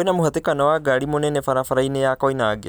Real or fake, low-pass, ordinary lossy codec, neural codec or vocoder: real; none; none; none